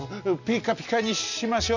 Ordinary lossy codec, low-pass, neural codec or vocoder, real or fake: none; 7.2 kHz; none; real